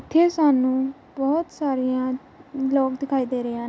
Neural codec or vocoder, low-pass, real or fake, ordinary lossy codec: none; none; real; none